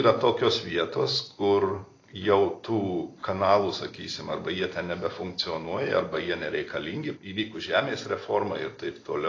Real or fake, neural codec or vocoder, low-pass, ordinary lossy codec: real; none; 7.2 kHz; AAC, 32 kbps